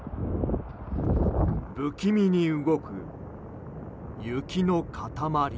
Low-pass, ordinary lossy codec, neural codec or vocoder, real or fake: none; none; none; real